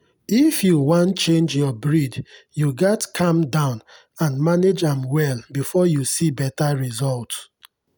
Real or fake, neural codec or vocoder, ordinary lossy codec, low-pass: real; none; none; none